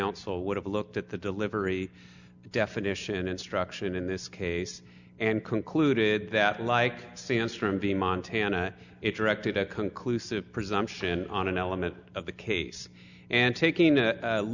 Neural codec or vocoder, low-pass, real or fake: none; 7.2 kHz; real